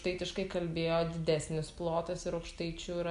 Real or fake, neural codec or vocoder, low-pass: real; none; 10.8 kHz